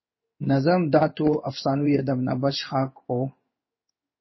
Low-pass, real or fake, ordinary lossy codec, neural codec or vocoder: 7.2 kHz; fake; MP3, 24 kbps; codec, 16 kHz in and 24 kHz out, 1 kbps, XY-Tokenizer